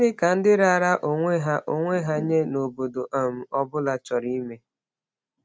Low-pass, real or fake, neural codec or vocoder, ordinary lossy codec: none; real; none; none